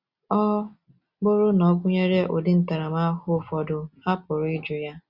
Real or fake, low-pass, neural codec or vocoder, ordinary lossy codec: real; 5.4 kHz; none; Opus, 64 kbps